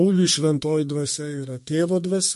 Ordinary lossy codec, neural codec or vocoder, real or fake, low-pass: MP3, 48 kbps; codec, 32 kHz, 1.9 kbps, SNAC; fake; 14.4 kHz